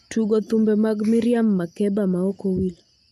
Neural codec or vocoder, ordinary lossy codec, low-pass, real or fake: none; none; 14.4 kHz; real